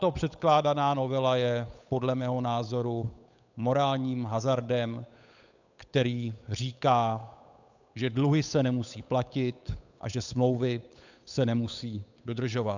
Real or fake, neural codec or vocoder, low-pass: fake; codec, 16 kHz, 8 kbps, FunCodec, trained on Chinese and English, 25 frames a second; 7.2 kHz